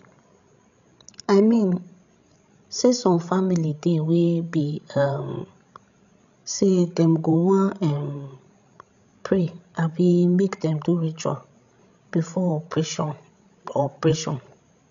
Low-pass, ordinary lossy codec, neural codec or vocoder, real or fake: 7.2 kHz; none; codec, 16 kHz, 16 kbps, FreqCodec, larger model; fake